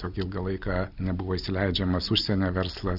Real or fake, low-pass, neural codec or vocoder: real; 5.4 kHz; none